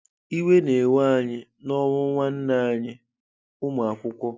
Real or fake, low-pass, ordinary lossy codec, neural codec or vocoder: real; none; none; none